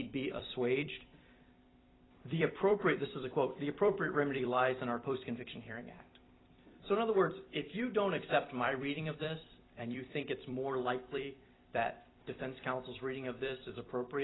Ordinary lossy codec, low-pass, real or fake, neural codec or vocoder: AAC, 16 kbps; 7.2 kHz; fake; vocoder, 44.1 kHz, 128 mel bands every 256 samples, BigVGAN v2